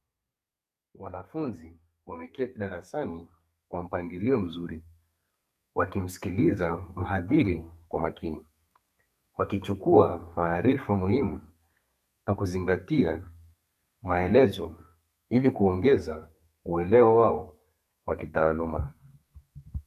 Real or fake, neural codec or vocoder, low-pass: fake; codec, 32 kHz, 1.9 kbps, SNAC; 14.4 kHz